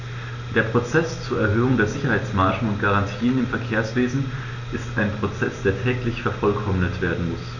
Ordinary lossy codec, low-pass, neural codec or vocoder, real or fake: none; 7.2 kHz; none; real